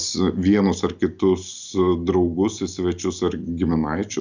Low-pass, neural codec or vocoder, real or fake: 7.2 kHz; none; real